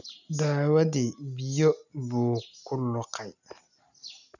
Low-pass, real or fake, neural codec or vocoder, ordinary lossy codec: 7.2 kHz; real; none; none